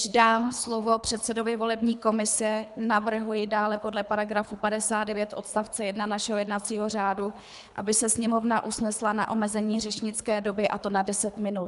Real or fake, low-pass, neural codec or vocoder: fake; 10.8 kHz; codec, 24 kHz, 3 kbps, HILCodec